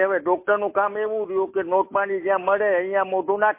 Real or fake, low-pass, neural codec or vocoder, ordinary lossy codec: real; 3.6 kHz; none; MP3, 24 kbps